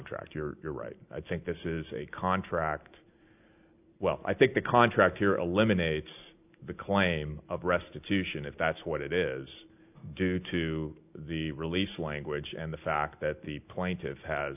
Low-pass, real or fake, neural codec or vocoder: 3.6 kHz; real; none